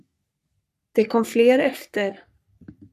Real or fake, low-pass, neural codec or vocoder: fake; 14.4 kHz; codec, 44.1 kHz, 3.4 kbps, Pupu-Codec